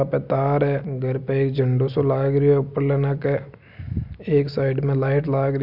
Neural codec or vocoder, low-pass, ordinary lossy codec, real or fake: none; 5.4 kHz; MP3, 48 kbps; real